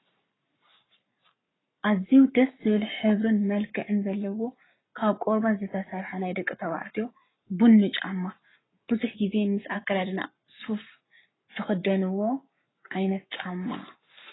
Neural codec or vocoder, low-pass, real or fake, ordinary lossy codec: codec, 44.1 kHz, 7.8 kbps, Pupu-Codec; 7.2 kHz; fake; AAC, 16 kbps